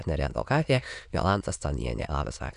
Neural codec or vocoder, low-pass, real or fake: autoencoder, 22.05 kHz, a latent of 192 numbers a frame, VITS, trained on many speakers; 9.9 kHz; fake